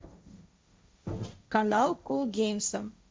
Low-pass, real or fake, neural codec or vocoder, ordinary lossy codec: none; fake; codec, 16 kHz, 1.1 kbps, Voila-Tokenizer; none